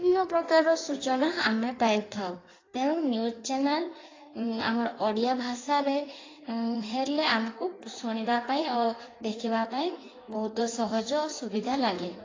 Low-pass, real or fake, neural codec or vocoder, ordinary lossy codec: 7.2 kHz; fake; codec, 16 kHz in and 24 kHz out, 1.1 kbps, FireRedTTS-2 codec; AAC, 32 kbps